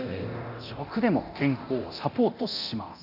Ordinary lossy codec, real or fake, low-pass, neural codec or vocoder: none; fake; 5.4 kHz; codec, 24 kHz, 0.9 kbps, DualCodec